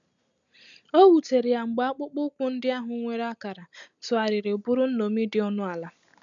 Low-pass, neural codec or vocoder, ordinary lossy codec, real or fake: 7.2 kHz; none; none; real